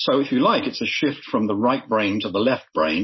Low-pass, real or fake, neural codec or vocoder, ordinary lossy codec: 7.2 kHz; real; none; MP3, 24 kbps